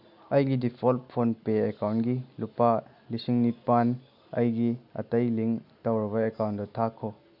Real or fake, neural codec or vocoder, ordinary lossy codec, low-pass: real; none; none; 5.4 kHz